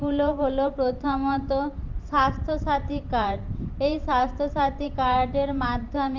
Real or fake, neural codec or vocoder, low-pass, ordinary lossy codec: fake; vocoder, 44.1 kHz, 128 mel bands every 512 samples, BigVGAN v2; 7.2 kHz; Opus, 32 kbps